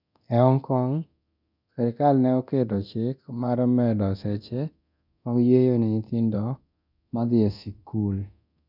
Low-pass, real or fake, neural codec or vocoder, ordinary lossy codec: 5.4 kHz; fake; codec, 24 kHz, 0.9 kbps, DualCodec; none